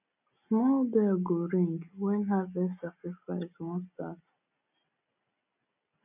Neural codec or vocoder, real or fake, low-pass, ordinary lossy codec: none; real; 3.6 kHz; none